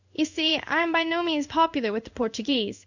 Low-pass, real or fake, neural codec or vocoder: 7.2 kHz; fake; codec, 16 kHz in and 24 kHz out, 1 kbps, XY-Tokenizer